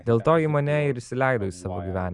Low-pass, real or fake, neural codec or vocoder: 10.8 kHz; real; none